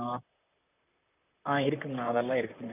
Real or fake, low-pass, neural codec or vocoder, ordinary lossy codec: fake; 3.6 kHz; vocoder, 44.1 kHz, 128 mel bands, Pupu-Vocoder; none